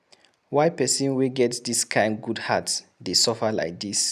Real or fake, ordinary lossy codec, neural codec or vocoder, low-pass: real; none; none; 14.4 kHz